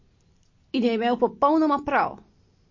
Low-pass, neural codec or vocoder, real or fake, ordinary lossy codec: 7.2 kHz; none; real; MP3, 32 kbps